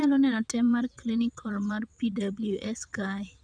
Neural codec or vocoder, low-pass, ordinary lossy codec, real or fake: vocoder, 22.05 kHz, 80 mel bands, WaveNeXt; 9.9 kHz; none; fake